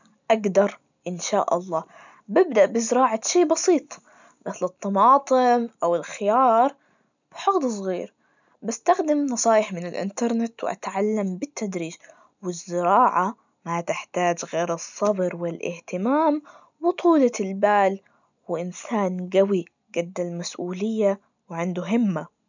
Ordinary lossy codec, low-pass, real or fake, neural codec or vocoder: none; 7.2 kHz; real; none